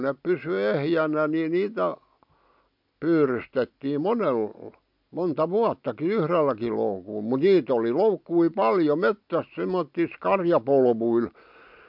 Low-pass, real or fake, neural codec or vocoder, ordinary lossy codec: 5.4 kHz; real; none; MP3, 48 kbps